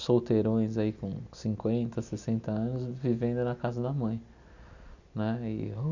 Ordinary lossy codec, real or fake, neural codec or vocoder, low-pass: none; real; none; 7.2 kHz